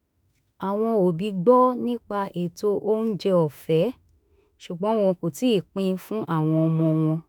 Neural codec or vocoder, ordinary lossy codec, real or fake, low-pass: autoencoder, 48 kHz, 32 numbers a frame, DAC-VAE, trained on Japanese speech; none; fake; none